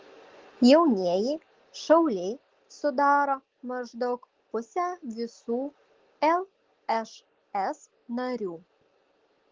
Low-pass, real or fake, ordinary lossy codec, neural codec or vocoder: 7.2 kHz; real; Opus, 16 kbps; none